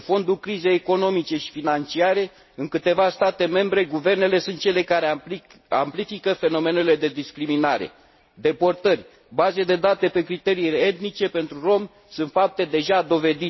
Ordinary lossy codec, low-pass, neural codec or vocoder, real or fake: MP3, 24 kbps; 7.2 kHz; none; real